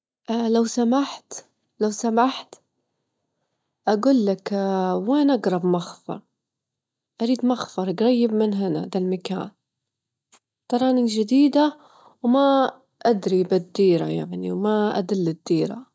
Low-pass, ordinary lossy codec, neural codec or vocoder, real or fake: none; none; none; real